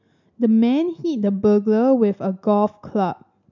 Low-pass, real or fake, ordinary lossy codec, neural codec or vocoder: 7.2 kHz; real; none; none